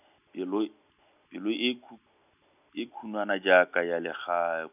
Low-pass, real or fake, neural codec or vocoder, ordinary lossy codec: 3.6 kHz; real; none; none